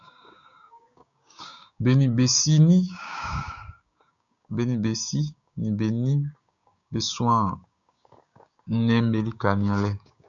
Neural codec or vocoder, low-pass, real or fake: codec, 16 kHz, 6 kbps, DAC; 7.2 kHz; fake